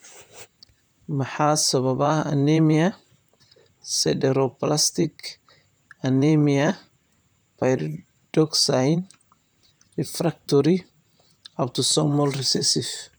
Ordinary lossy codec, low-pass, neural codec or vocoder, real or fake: none; none; vocoder, 44.1 kHz, 128 mel bands, Pupu-Vocoder; fake